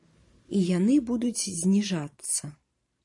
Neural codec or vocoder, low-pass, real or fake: vocoder, 44.1 kHz, 128 mel bands every 512 samples, BigVGAN v2; 10.8 kHz; fake